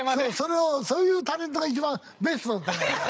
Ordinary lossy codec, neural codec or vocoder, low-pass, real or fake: none; codec, 16 kHz, 8 kbps, FreqCodec, larger model; none; fake